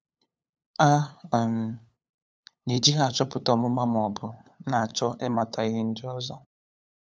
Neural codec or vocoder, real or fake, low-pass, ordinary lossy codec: codec, 16 kHz, 8 kbps, FunCodec, trained on LibriTTS, 25 frames a second; fake; none; none